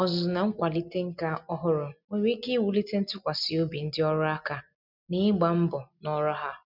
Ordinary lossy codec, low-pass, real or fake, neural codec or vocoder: none; 5.4 kHz; real; none